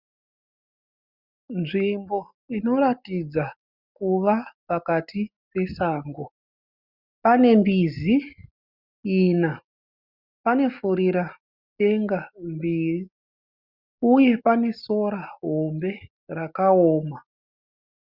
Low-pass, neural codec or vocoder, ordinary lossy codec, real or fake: 5.4 kHz; none; Opus, 64 kbps; real